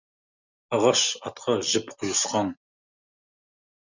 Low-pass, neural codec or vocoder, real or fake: 7.2 kHz; none; real